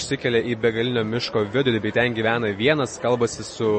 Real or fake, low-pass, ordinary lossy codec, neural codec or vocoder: real; 10.8 kHz; MP3, 32 kbps; none